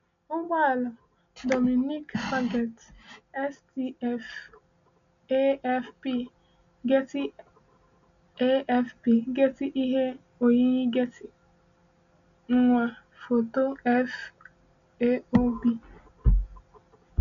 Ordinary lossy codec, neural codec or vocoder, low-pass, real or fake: MP3, 64 kbps; none; 7.2 kHz; real